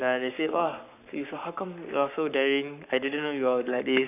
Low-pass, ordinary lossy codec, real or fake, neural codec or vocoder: 3.6 kHz; none; fake; codec, 44.1 kHz, 7.8 kbps, Pupu-Codec